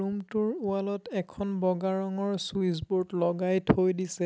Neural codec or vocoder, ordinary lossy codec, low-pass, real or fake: none; none; none; real